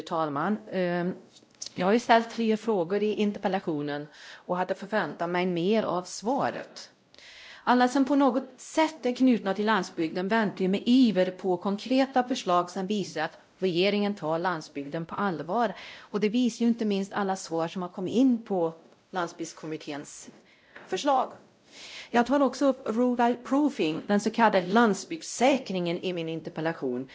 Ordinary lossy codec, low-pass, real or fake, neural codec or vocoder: none; none; fake; codec, 16 kHz, 0.5 kbps, X-Codec, WavLM features, trained on Multilingual LibriSpeech